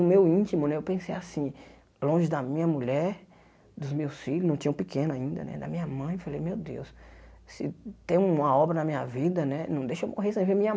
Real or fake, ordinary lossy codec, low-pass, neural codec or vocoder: real; none; none; none